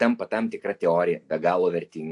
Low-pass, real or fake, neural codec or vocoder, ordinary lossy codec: 10.8 kHz; real; none; AAC, 64 kbps